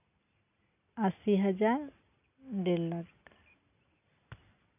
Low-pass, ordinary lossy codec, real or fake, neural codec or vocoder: 3.6 kHz; none; real; none